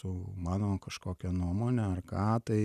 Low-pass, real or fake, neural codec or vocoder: 14.4 kHz; real; none